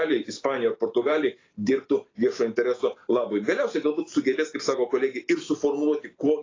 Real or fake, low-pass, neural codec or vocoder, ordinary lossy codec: real; 7.2 kHz; none; AAC, 32 kbps